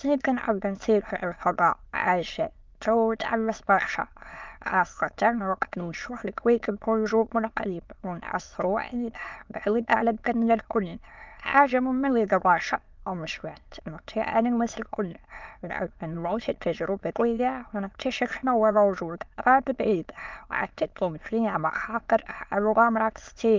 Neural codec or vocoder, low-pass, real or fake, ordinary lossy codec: autoencoder, 22.05 kHz, a latent of 192 numbers a frame, VITS, trained on many speakers; 7.2 kHz; fake; Opus, 24 kbps